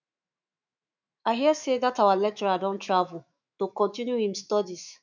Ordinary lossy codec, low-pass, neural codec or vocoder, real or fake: none; 7.2 kHz; autoencoder, 48 kHz, 128 numbers a frame, DAC-VAE, trained on Japanese speech; fake